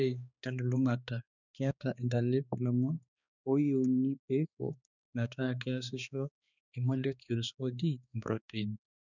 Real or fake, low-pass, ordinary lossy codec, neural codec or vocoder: fake; 7.2 kHz; none; codec, 16 kHz, 2 kbps, X-Codec, HuBERT features, trained on balanced general audio